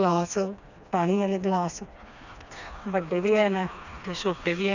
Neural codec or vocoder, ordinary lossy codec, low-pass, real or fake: codec, 16 kHz, 2 kbps, FreqCodec, smaller model; none; 7.2 kHz; fake